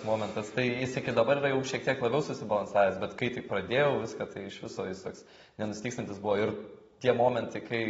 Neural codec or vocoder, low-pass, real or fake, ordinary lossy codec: none; 9.9 kHz; real; AAC, 24 kbps